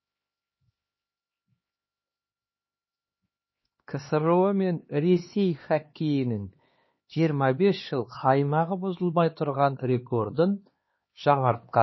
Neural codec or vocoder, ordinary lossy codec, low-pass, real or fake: codec, 16 kHz, 2 kbps, X-Codec, HuBERT features, trained on LibriSpeech; MP3, 24 kbps; 7.2 kHz; fake